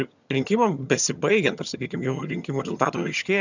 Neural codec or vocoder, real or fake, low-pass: vocoder, 22.05 kHz, 80 mel bands, HiFi-GAN; fake; 7.2 kHz